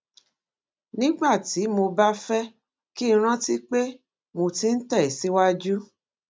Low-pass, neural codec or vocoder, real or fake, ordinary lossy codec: none; none; real; none